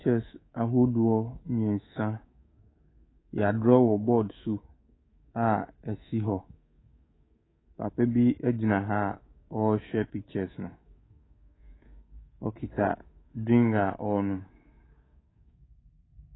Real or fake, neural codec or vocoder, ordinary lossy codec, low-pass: fake; codec, 24 kHz, 3.1 kbps, DualCodec; AAC, 16 kbps; 7.2 kHz